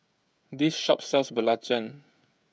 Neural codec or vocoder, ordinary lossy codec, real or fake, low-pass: codec, 16 kHz, 16 kbps, FreqCodec, smaller model; none; fake; none